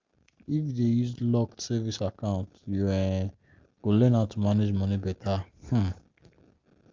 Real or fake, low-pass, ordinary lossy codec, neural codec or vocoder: real; 7.2 kHz; Opus, 32 kbps; none